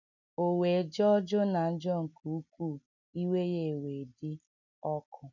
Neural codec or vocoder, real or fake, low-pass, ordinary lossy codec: none; real; 7.2 kHz; none